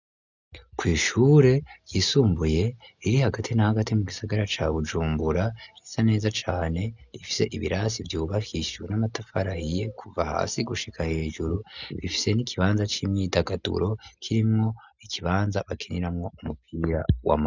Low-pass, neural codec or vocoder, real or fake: 7.2 kHz; none; real